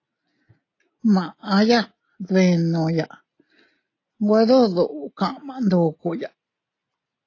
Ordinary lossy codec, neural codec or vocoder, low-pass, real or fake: AAC, 32 kbps; none; 7.2 kHz; real